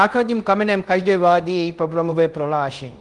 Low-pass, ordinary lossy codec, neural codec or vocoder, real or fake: 10.8 kHz; Opus, 24 kbps; codec, 24 kHz, 0.5 kbps, DualCodec; fake